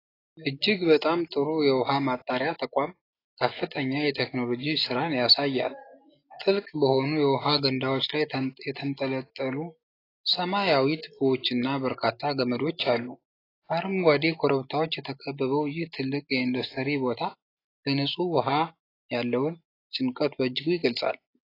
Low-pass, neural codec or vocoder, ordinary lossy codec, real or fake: 5.4 kHz; none; AAC, 24 kbps; real